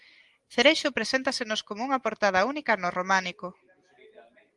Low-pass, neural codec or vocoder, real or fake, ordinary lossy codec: 10.8 kHz; none; real; Opus, 24 kbps